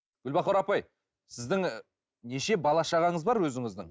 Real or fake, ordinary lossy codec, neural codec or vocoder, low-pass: real; none; none; none